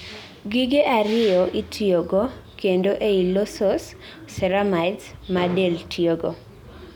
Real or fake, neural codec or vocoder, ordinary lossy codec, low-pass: real; none; none; 19.8 kHz